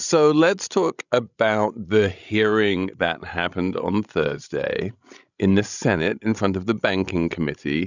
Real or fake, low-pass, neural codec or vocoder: fake; 7.2 kHz; codec, 16 kHz, 16 kbps, FreqCodec, larger model